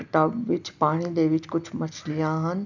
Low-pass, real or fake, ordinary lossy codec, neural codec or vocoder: 7.2 kHz; real; none; none